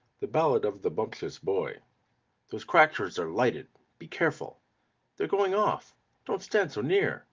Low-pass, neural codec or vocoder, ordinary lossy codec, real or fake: 7.2 kHz; none; Opus, 32 kbps; real